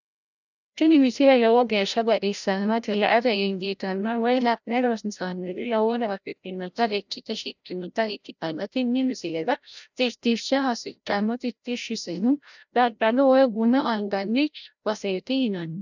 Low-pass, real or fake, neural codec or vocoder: 7.2 kHz; fake; codec, 16 kHz, 0.5 kbps, FreqCodec, larger model